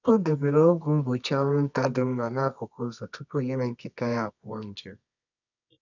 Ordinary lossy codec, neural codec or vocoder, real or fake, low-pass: none; codec, 24 kHz, 0.9 kbps, WavTokenizer, medium music audio release; fake; 7.2 kHz